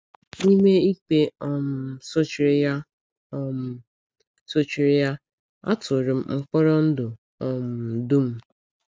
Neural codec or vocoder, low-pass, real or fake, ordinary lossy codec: none; none; real; none